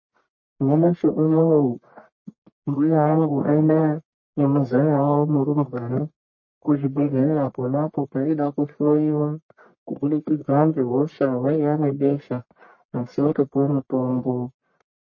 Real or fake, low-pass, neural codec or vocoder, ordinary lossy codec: fake; 7.2 kHz; codec, 44.1 kHz, 1.7 kbps, Pupu-Codec; MP3, 32 kbps